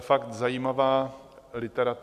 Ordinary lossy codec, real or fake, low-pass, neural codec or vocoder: AAC, 96 kbps; real; 14.4 kHz; none